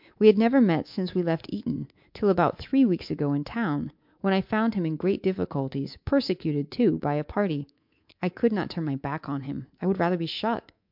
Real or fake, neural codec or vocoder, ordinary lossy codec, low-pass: fake; codec, 24 kHz, 3.1 kbps, DualCodec; MP3, 48 kbps; 5.4 kHz